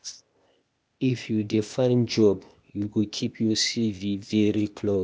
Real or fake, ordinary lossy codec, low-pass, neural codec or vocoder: fake; none; none; codec, 16 kHz, 0.8 kbps, ZipCodec